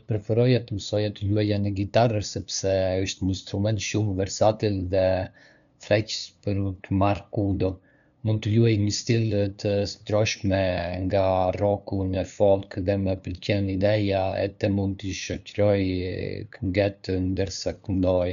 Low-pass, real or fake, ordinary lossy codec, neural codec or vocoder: 7.2 kHz; fake; none; codec, 16 kHz, 2 kbps, FunCodec, trained on LibriTTS, 25 frames a second